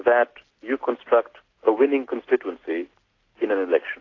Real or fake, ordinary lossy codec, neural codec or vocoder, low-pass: real; AAC, 32 kbps; none; 7.2 kHz